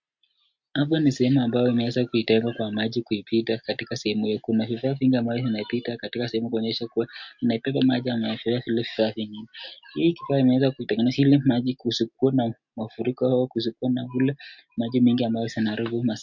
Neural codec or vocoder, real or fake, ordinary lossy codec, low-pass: none; real; MP3, 48 kbps; 7.2 kHz